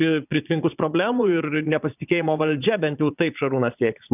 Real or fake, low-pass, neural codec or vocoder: fake; 3.6 kHz; vocoder, 22.05 kHz, 80 mel bands, Vocos